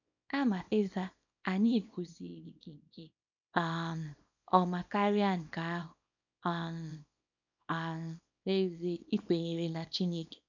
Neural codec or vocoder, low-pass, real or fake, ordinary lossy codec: codec, 24 kHz, 0.9 kbps, WavTokenizer, small release; 7.2 kHz; fake; none